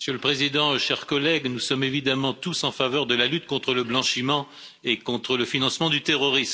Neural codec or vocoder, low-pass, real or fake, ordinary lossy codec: none; none; real; none